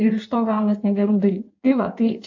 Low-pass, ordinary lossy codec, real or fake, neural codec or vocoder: 7.2 kHz; AAC, 48 kbps; fake; codec, 16 kHz in and 24 kHz out, 1.1 kbps, FireRedTTS-2 codec